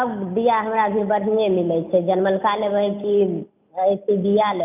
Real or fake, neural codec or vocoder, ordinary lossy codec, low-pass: real; none; none; 3.6 kHz